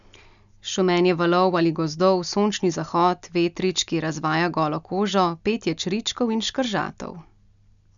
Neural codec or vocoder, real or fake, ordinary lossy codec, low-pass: none; real; none; 7.2 kHz